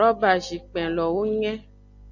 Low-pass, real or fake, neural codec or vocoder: 7.2 kHz; real; none